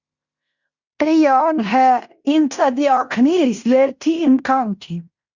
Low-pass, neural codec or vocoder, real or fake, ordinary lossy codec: 7.2 kHz; codec, 16 kHz in and 24 kHz out, 0.9 kbps, LongCat-Audio-Codec, fine tuned four codebook decoder; fake; Opus, 64 kbps